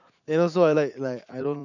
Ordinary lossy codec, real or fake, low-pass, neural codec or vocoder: none; fake; 7.2 kHz; vocoder, 44.1 kHz, 80 mel bands, Vocos